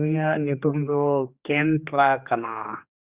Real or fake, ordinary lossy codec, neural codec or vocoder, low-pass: fake; Opus, 64 kbps; codec, 16 kHz, 2 kbps, X-Codec, HuBERT features, trained on general audio; 3.6 kHz